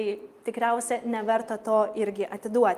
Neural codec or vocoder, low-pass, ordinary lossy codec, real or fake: none; 14.4 kHz; Opus, 32 kbps; real